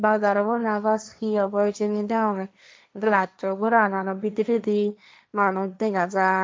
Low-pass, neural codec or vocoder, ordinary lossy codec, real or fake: none; codec, 16 kHz, 1.1 kbps, Voila-Tokenizer; none; fake